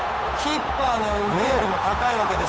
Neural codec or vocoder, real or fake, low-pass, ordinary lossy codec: codec, 16 kHz, 8 kbps, FunCodec, trained on Chinese and English, 25 frames a second; fake; none; none